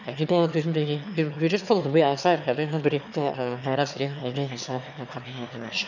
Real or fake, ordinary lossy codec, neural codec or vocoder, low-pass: fake; none; autoencoder, 22.05 kHz, a latent of 192 numbers a frame, VITS, trained on one speaker; 7.2 kHz